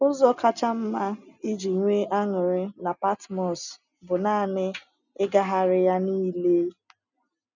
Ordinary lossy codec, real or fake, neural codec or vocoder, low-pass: none; real; none; 7.2 kHz